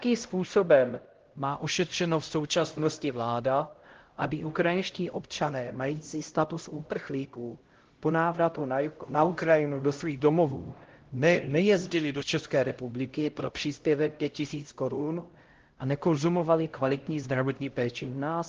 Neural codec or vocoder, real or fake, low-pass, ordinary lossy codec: codec, 16 kHz, 0.5 kbps, X-Codec, HuBERT features, trained on LibriSpeech; fake; 7.2 kHz; Opus, 16 kbps